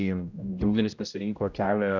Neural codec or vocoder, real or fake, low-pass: codec, 16 kHz, 0.5 kbps, X-Codec, HuBERT features, trained on general audio; fake; 7.2 kHz